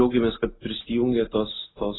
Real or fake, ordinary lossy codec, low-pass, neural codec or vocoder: real; AAC, 16 kbps; 7.2 kHz; none